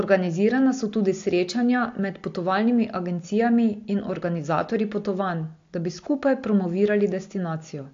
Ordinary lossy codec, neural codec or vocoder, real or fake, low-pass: AAC, 48 kbps; none; real; 7.2 kHz